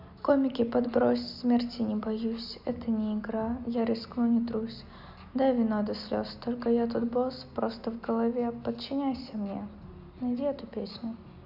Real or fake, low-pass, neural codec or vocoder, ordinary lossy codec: real; 5.4 kHz; none; none